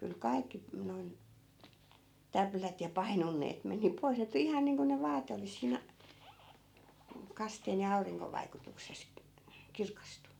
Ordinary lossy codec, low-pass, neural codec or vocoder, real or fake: none; 19.8 kHz; none; real